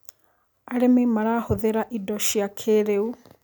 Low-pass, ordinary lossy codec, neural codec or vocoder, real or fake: none; none; none; real